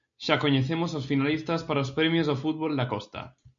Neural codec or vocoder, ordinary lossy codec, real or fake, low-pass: none; MP3, 64 kbps; real; 7.2 kHz